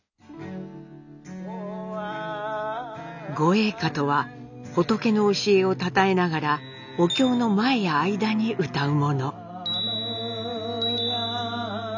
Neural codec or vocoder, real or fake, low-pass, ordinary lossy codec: none; real; 7.2 kHz; none